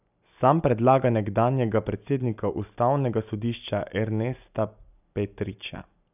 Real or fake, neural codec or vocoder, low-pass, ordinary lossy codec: real; none; 3.6 kHz; none